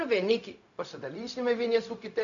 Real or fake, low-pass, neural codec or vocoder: fake; 7.2 kHz; codec, 16 kHz, 0.4 kbps, LongCat-Audio-Codec